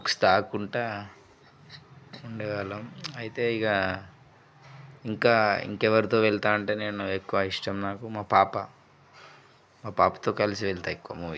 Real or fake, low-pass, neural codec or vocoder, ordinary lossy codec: real; none; none; none